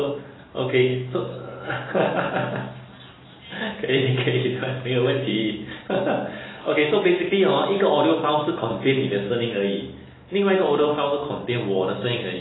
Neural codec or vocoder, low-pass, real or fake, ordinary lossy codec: none; 7.2 kHz; real; AAC, 16 kbps